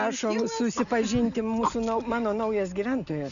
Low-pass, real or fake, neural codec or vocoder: 7.2 kHz; real; none